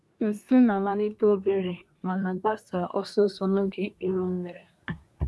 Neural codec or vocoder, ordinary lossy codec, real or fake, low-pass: codec, 24 kHz, 1 kbps, SNAC; none; fake; none